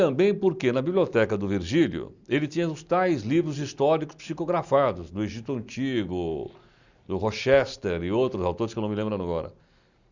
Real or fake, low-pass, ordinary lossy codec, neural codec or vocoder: real; 7.2 kHz; Opus, 64 kbps; none